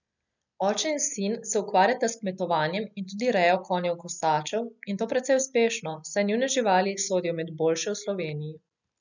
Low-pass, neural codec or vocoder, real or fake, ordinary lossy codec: 7.2 kHz; none; real; none